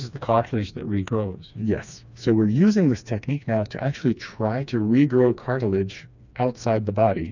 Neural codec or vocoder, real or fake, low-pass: codec, 16 kHz, 2 kbps, FreqCodec, smaller model; fake; 7.2 kHz